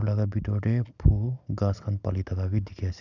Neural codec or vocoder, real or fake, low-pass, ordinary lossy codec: none; real; 7.2 kHz; none